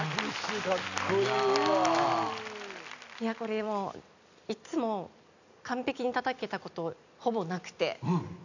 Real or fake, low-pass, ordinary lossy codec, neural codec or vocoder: real; 7.2 kHz; none; none